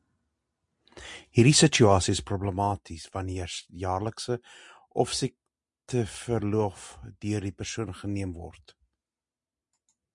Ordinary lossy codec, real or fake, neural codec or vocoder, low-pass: MP3, 64 kbps; real; none; 10.8 kHz